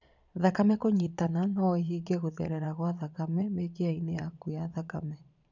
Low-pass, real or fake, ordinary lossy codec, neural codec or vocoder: 7.2 kHz; fake; none; vocoder, 22.05 kHz, 80 mel bands, Vocos